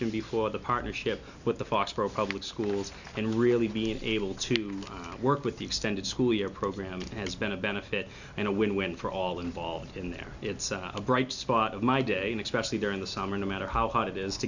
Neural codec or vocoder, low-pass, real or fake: none; 7.2 kHz; real